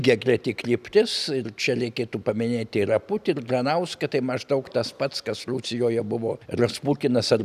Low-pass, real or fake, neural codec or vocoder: 14.4 kHz; real; none